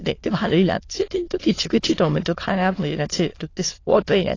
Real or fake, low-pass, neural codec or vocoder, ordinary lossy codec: fake; 7.2 kHz; autoencoder, 22.05 kHz, a latent of 192 numbers a frame, VITS, trained on many speakers; AAC, 32 kbps